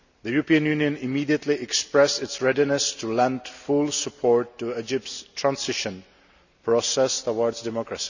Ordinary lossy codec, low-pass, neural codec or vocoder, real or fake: none; 7.2 kHz; none; real